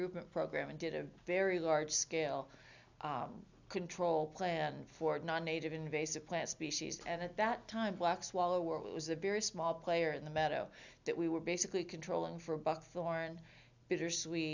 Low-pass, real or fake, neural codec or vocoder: 7.2 kHz; real; none